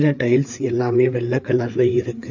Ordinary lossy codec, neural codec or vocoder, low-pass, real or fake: none; codec, 16 kHz, 4 kbps, FreqCodec, larger model; 7.2 kHz; fake